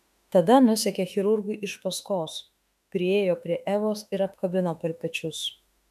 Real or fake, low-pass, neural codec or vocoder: fake; 14.4 kHz; autoencoder, 48 kHz, 32 numbers a frame, DAC-VAE, trained on Japanese speech